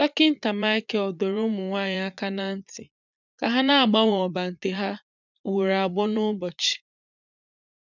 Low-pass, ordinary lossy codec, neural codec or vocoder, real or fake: 7.2 kHz; none; vocoder, 44.1 kHz, 80 mel bands, Vocos; fake